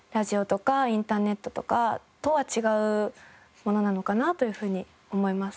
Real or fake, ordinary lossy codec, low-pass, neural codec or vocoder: real; none; none; none